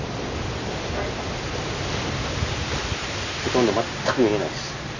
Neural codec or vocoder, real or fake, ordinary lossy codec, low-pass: none; real; none; 7.2 kHz